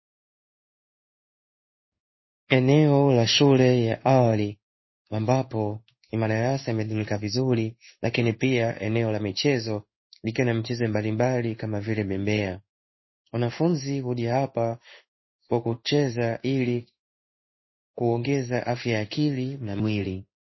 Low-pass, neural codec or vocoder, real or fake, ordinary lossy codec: 7.2 kHz; codec, 16 kHz in and 24 kHz out, 1 kbps, XY-Tokenizer; fake; MP3, 24 kbps